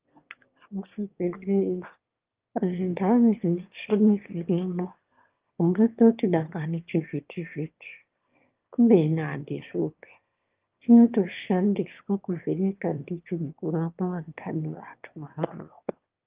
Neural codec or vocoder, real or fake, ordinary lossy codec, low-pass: autoencoder, 22.05 kHz, a latent of 192 numbers a frame, VITS, trained on one speaker; fake; Opus, 32 kbps; 3.6 kHz